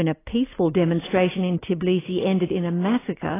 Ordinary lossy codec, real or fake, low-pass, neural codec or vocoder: AAC, 16 kbps; real; 3.6 kHz; none